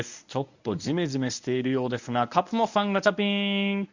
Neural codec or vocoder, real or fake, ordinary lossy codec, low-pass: codec, 24 kHz, 0.9 kbps, WavTokenizer, medium speech release version 1; fake; none; 7.2 kHz